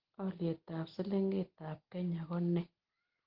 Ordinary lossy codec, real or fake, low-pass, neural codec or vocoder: Opus, 16 kbps; real; 5.4 kHz; none